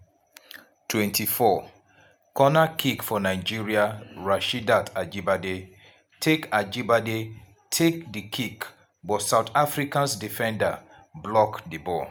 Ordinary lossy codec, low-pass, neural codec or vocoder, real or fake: none; none; none; real